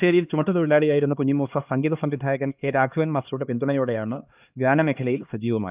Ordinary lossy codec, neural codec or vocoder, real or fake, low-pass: Opus, 24 kbps; codec, 16 kHz, 2 kbps, X-Codec, HuBERT features, trained on LibriSpeech; fake; 3.6 kHz